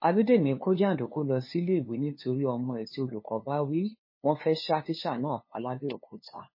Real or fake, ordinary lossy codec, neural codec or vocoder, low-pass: fake; MP3, 24 kbps; codec, 16 kHz, 2 kbps, FunCodec, trained on LibriTTS, 25 frames a second; 5.4 kHz